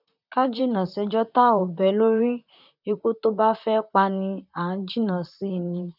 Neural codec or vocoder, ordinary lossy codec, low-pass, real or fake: vocoder, 44.1 kHz, 128 mel bands, Pupu-Vocoder; none; 5.4 kHz; fake